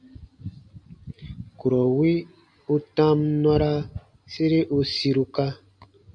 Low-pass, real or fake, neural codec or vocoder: 9.9 kHz; real; none